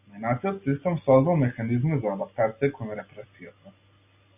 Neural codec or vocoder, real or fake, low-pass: none; real; 3.6 kHz